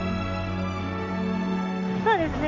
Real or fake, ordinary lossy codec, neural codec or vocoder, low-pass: real; none; none; 7.2 kHz